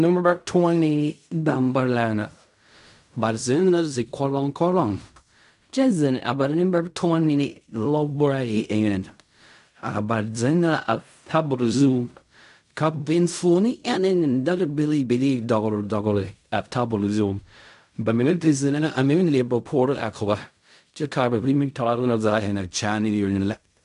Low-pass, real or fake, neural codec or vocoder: 10.8 kHz; fake; codec, 16 kHz in and 24 kHz out, 0.4 kbps, LongCat-Audio-Codec, fine tuned four codebook decoder